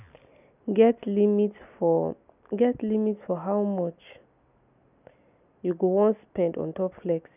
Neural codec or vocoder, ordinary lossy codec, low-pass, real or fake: none; none; 3.6 kHz; real